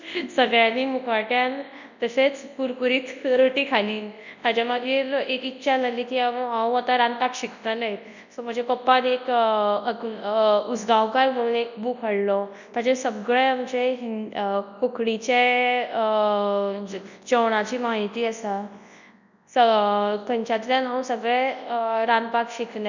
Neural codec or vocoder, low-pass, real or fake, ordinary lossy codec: codec, 24 kHz, 0.9 kbps, WavTokenizer, large speech release; 7.2 kHz; fake; none